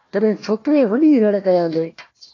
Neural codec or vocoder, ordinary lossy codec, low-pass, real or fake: codec, 16 kHz, 1 kbps, FunCodec, trained on Chinese and English, 50 frames a second; AAC, 32 kbps; 7.2 kHz; fake